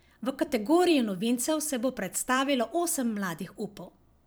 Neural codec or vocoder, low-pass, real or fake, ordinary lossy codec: vocoder, 44.1 kHz, 128 mel bands every 256 samples, BigVGAN v2; none; fake; none